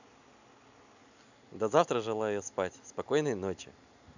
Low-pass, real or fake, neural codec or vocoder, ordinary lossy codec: 7.2 kHz; real; none; none